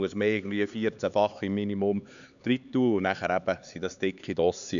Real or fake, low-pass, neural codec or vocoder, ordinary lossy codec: fake; 7.2 kHz; codec, 16 kHz, 4 kbps, X-Codec, HuBERT features, trained on LibriSpeech; none